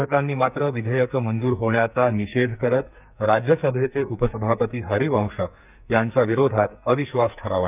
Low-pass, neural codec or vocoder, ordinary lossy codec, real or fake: 3.6 kHz; codec, 44.1 kHz, 2.6 kbps, SNAC; none; fake